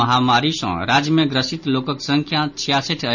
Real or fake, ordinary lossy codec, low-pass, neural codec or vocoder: real; none; 7.2 kHz; none